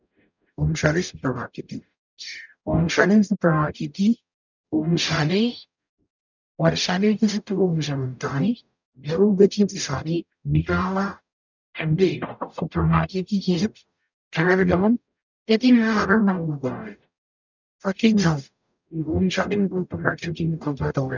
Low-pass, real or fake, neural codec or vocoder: 7.2 kHz; fake; codec, 44.1 kHz, 0.9 kbps, DAC